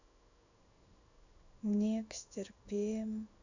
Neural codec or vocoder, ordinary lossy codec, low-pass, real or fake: codec, 16 kHz in and 24 kHz out, 1 kbps, XY-Tokenizer; none; 7.2 kHz; fake